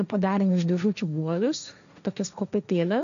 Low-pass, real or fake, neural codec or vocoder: 7.2 kHz; fake; codec, 16 kHz, 1.1 kbps, Voila-Tokenizer